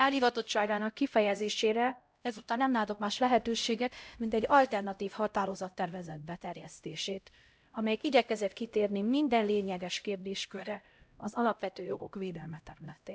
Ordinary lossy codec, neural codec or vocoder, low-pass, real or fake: none; codec, 16 kHz, 0.5 kbps, X-Codec, HuBERT features, trained on LibriSpeech; none; fake